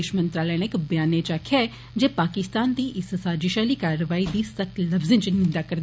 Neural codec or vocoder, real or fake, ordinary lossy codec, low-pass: none; real; none; none